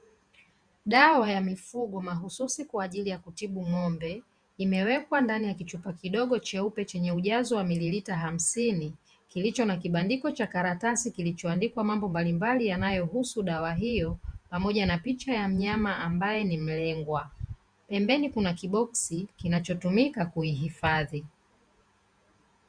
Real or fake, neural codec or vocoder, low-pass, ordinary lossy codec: fake; vocoder, 48 kHz, 128 mel bands, Vocos; 9.9 kHz; Opus, 64 kbps